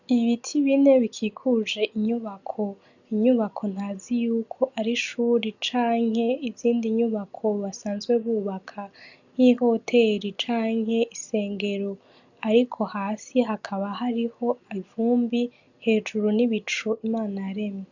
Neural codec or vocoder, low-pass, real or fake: none; 7.2 kHz; real